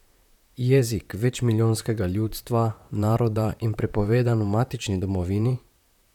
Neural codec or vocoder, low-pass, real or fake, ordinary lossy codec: vocoder, 44.1 kHz, 128 mel bands, Pupu-Vocoder; 19.8 kHz; fake; none